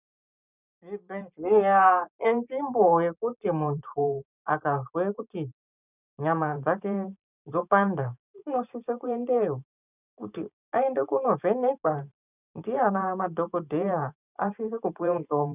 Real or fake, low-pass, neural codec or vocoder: fake; 3.6 kHz; vocoder, 44.1 kHz, 128 mel bands every 512 samples, BigVGAN v2